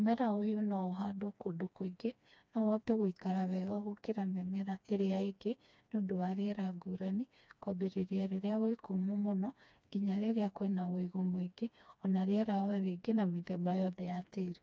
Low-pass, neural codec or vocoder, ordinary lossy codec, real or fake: none; codec, 16 kHz, 2 kbps, FreqCodec, smaller model; none; fake